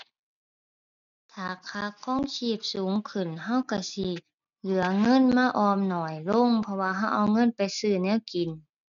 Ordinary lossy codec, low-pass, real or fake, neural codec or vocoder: none; 7.2 kHz; real; none